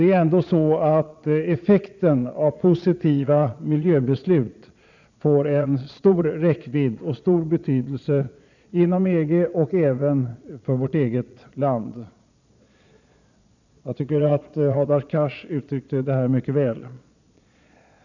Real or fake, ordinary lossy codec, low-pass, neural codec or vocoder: fake; none; 7.2 kHz; vocoder, 22.05 kHz, 80 mel bands, Vocos